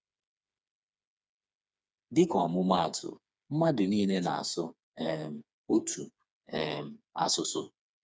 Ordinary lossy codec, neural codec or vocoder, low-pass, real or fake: none; codec, 16 kHz, 4 kbps, FreqCodec, smaller model; none; fake